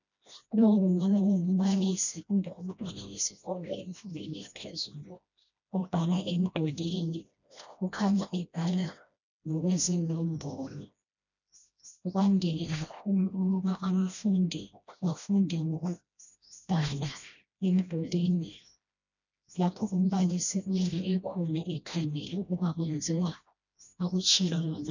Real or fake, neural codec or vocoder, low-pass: fake; codec, 16 kHz, 1 kbps, FreqCodec, smaller model; 7.2 kHz